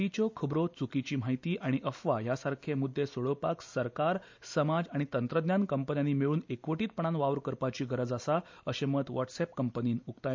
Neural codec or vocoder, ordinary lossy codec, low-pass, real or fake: none; MP3, 64 kbps; 7.2 kHz; real